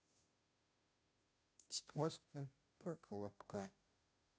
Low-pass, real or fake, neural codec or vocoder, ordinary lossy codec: none; fake; codec, 16 kHz, 0.5 kbps, FunCodec, trained on Chinese and English, 25 frames a second; none